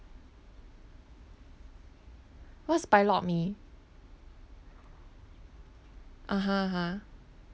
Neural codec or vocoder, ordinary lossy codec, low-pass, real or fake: none; none; none; real